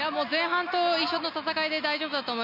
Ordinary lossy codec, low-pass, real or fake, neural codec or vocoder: MP3, 32 kbps; 5.4 kHz; real; none